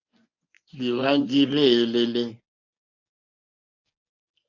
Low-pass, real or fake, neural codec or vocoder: 7.2 kHz; fake; codec, 24 kHz, 0.9 kbps, WavTokenizer, medium speech release version 1